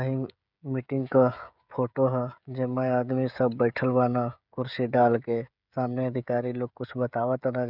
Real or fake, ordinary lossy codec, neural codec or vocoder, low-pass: fake; none; codec, 16 kHz, 16 kbps, FreqCodec, smaller model; 5.4 kHz